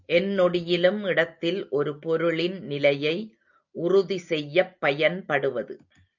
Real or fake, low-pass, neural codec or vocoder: real; 7.2 kHz; none